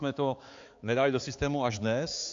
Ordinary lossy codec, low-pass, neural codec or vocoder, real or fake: AAC, 48 kbps; 7.2 kHz; codec, 16 kHz, 4 kbps, X-Codec, HuBERT features, trained on balanced general audio; fake